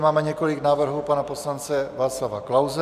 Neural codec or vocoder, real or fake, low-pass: none; real; 14.4 kHz